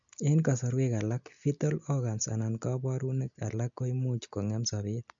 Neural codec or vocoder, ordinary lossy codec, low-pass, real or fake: none; MP3, 64 kbps; 7.2 kHz; real